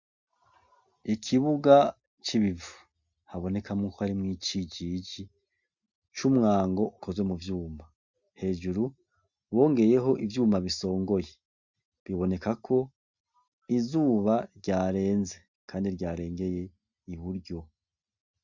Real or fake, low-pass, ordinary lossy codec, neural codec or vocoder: real; 7.2 kHz; Opus, 64 kbps; none